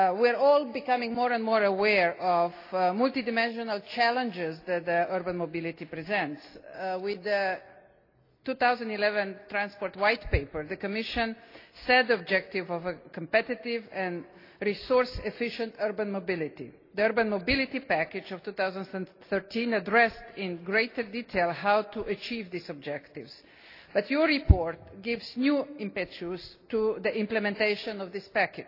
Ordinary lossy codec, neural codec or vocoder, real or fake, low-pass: AAC, 32 kbps; none; real; 5.4 kHz